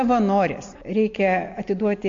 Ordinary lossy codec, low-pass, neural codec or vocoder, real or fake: AAC, 48 kbps; 7.2 kHz; none; real